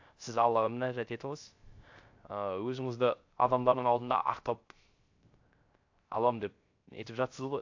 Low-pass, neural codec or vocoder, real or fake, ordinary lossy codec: 7.2 kHz; codec, 16 kHz, 0.3 kbps, FocalCodec; fake; AAC, 48 kbps